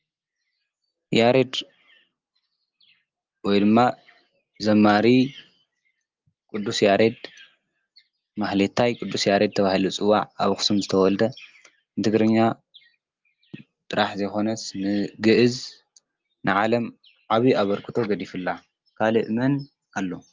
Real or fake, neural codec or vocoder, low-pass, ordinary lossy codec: real; none; 7.2 kHz; Opus, 32 kbps